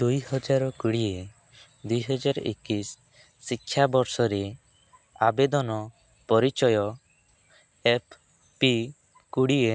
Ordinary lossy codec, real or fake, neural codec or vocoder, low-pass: none; real; none; none